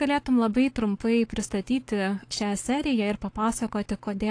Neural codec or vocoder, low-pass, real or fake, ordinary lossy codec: codec, 44.1 kHz, 7.8 kbps, Pupu-Codec; 9.9 kHz; fake; AAC, 48 kbps